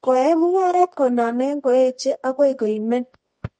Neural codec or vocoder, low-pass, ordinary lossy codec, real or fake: codec, 24 kHz, 0.9 kbps, WavTokenizer, medium music audio release; 10.8 kHz; MP3, 48 kbps; fake